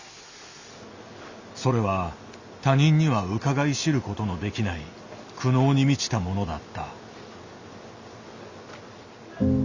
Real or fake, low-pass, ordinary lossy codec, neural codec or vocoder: real; 7.2 kHz; Opus, 64 kbps; none